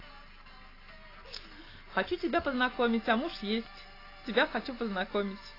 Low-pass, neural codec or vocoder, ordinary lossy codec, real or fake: 5.4 kHz; none; AAC, 24 kbps; real